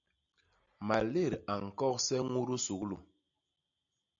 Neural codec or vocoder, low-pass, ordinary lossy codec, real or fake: none; 7.2 kHz; MP3, 64 kbps; real